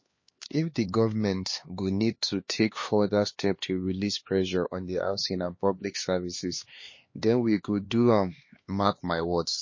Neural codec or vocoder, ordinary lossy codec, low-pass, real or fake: codec, 16 kHz, 2 kbps, X-Codec, HuBERT features, trained on LibriSpeech; MP3, 32 kbps; 7.2 kHz; fake